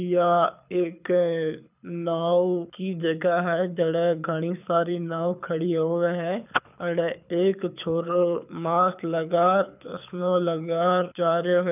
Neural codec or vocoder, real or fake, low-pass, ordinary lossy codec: codec, 24 kHz, 6 kbps, HILCodec; fake; 3.6 kHz; none